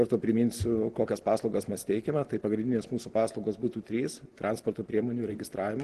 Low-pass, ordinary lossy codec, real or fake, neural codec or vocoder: 10.8 kHz; Opus, 16 kbps; real; none